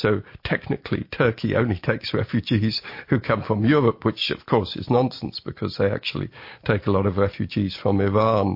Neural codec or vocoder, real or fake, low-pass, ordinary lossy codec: none; real; 5.4 kHz; MP3, 32 kbps